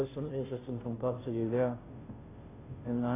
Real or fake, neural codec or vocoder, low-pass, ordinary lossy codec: fake; codec, 16 kHz, 0.5 kbps, FunCodec, trained on Chinese and English, 25 frames a second; 3.6 kHz; AAC, 16 kbps